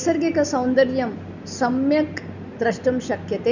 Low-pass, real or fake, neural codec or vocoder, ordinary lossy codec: 7.2 kHz; real; none; none